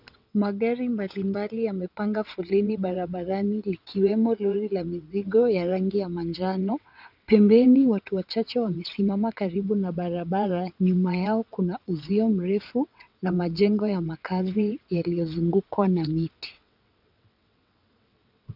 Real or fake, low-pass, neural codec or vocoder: fake; 5.4 kHz; vocoder, 22.05 kHz, 80 mel bands, WaveNeXt